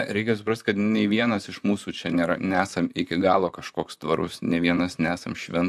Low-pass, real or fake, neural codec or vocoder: 14.4 kHz; fake; vocoder, 44.1 kHz, 128 mel bands, Pupu-Vocoder